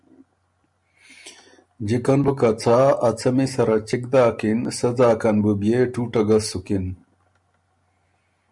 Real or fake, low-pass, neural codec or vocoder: real; 10.8 kHz; none